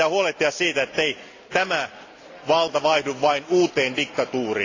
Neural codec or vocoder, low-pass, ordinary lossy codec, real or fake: none; 7.2 kHz; AAC, 32 kbps; real